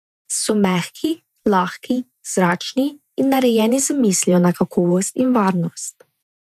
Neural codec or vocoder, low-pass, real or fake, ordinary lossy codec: vocoder, 48 kHz, 128 mel bands, Vocos; 14.4 kHz; fake; none